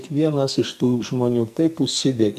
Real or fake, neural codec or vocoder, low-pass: fake; codec, 44.1 kHz, 2.6 kbps, SNAC; 14.4 kHz